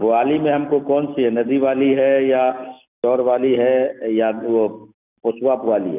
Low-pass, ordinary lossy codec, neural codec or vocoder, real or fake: 3.6 kHz; none; none; real